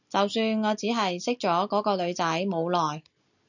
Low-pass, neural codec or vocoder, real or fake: 7.2 kHz; none; real